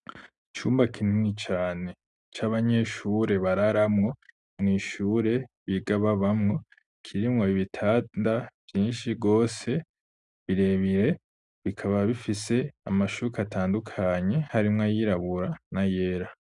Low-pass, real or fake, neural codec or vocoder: 10.8 kHz; real; none